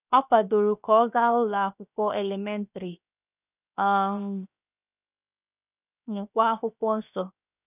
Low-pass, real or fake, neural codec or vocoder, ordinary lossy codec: 3.6 kHz; fake; codec, 16 kHz, 0.7 kbps, FocalCodec; none